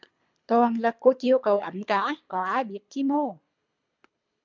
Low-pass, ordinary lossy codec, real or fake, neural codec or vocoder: 7.2 kHz; MP3, 64 kbps; fake; codec, 24 kHz, 3 kbps, HILCodec